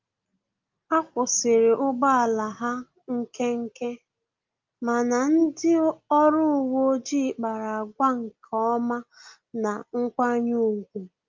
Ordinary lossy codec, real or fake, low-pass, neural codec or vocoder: Opus, 32 kbps; real; 7.2 kHz; none